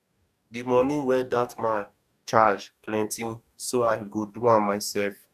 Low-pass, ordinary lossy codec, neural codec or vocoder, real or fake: 14.4 kHz; none; codec, 44.1 kHz, 2.6 kbps, DAC; fake